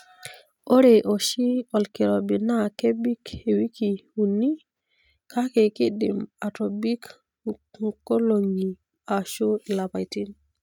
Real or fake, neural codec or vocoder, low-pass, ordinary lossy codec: real; none; 19.8 kHz; none